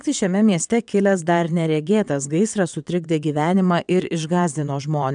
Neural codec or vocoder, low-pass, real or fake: vocoder, 22.05 kHz, 80 mel bands, WaveNeXt; 9.9 kHz; fake